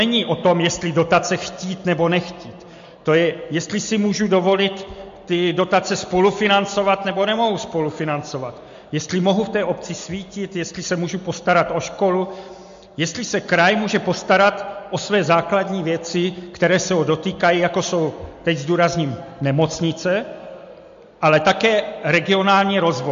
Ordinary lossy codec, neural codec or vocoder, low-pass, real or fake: MP3, 48 kbps; none; 7.2 kHz; real